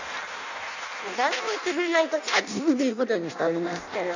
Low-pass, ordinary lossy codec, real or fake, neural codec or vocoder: 7.2 kHz; none; fake; codec, 16 kHz in and 24 kHz out, 0.6 kbps, FireRedTTS-2 codec